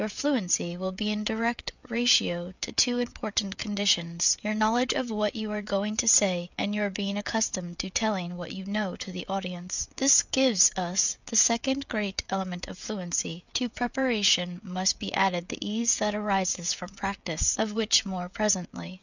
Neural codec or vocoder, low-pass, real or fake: codec, 16 kHz, 16 kbps, FreqCodec, smaller model; 7.2 kHz; fake